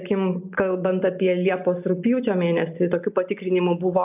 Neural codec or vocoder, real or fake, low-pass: none; real; 3.6 kHz